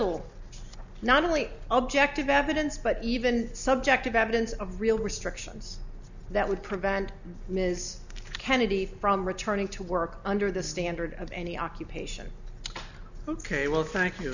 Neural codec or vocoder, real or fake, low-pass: none; real; 7.2 kHz